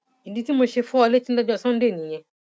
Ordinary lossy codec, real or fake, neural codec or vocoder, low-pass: none; real; none; none